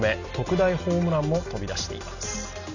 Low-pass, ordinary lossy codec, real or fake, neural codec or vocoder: 7.2 kHz; none; real; none